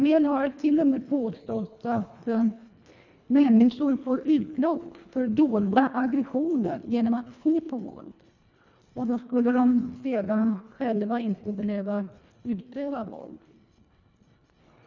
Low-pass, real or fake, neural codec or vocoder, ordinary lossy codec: 7.2 kHz; fake; codec, 24 kHz, 1.5 kbps, HILCodec; none